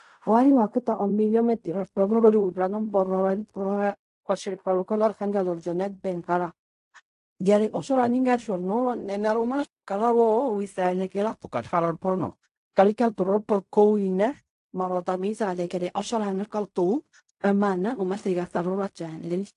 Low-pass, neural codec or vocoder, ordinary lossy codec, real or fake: 10.8 kHz; codec, 16 kHz in and 24 kHz out, 0.4 kbps, LongCat-Audio-Codec, fine tuned four codebook decoder; AAC, 64 kbps; fake